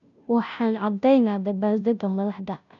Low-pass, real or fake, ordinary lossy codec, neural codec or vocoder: 7.2 kHz; fake; none; codec, 16 kHz, 0.5 kbps, FunCodec, trained on Chinese and English, 25 frames a second